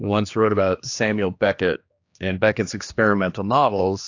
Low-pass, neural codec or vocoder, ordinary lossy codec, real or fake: 7.2 kHz; codec, 16 kHz, 2 kbps, X-Codec, HuBERT features, trained on general audio; AAC, 48 kbps; fake